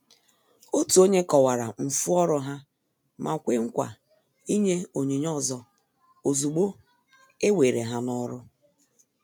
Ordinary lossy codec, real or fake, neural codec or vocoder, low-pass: none; real; none; none